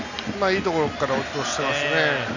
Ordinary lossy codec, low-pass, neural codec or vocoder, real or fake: none; 7.2 kHz; none; real